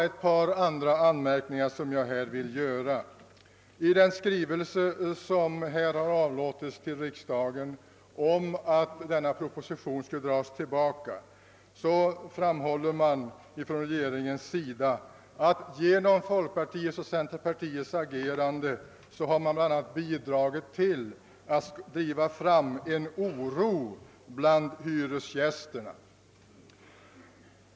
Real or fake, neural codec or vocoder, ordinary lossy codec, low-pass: real; none; none; none